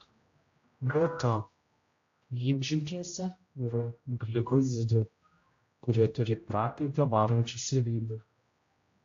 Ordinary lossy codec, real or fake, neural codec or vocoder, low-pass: AAC, 48 kbps; fake; codec, 16 kHz, 0.5 kbps, X-Codec, HuBERT features, trained on general audio; 7.2 kHz